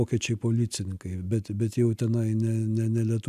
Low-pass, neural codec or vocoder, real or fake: 14.4 kHz; none; real